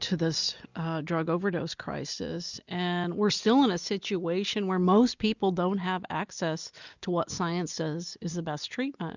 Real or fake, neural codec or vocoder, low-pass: real; none; 7.2 kHz